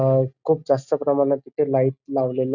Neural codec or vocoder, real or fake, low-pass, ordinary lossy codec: none; real; 7.2 kHz; none